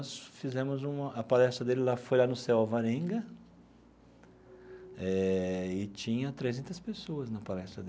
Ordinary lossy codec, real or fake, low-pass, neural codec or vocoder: none; real; none; none